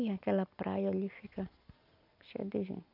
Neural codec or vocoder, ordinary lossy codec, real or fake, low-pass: none; none; real; 5.4 kHz